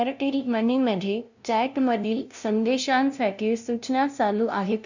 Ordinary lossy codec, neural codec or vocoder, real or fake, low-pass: none; codec, 16 kHz, 0.5 kbps, FunCodec, trained on LibriTTS, 25 frames a second; fake; 7.2 kHz